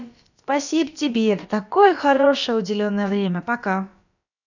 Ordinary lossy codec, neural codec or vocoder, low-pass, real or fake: none; codec, 16 kHz, about 1 kbps, DyCAST, with the encoder's durations; 7.2 kHz; fake